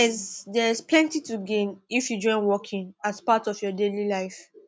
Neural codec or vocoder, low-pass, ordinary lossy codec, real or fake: none; none; none; real